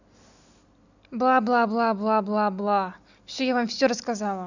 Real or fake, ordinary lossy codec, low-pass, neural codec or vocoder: real; none; 7.2 kHz; none